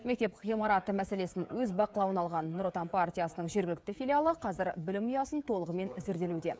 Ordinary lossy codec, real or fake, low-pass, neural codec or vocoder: none; fake; none; codec, 16 kHz, 16 kbps, FreqCodec, smaller model